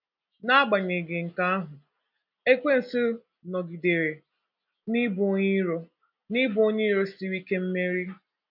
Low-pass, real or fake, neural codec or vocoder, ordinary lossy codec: 5.4 kHz; real; none; none